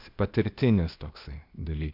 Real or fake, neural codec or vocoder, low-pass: fake; codec, 16 kHz, 0.8 kbps, ZipCodec; 5.4 kHz